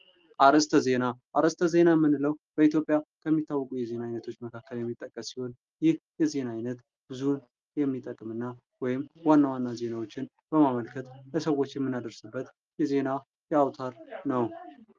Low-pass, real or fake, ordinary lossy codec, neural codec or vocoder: 7.2 kHz; real; Opus, 32 kbps; none